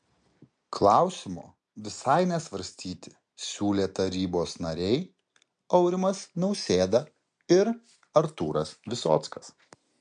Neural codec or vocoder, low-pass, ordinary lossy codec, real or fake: none; 9.9 kHz; MP3, 64 kbps; real